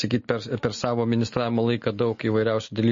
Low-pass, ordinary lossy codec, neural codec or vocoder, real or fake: 7.2 kHz; MP3, 32 kbps; none; real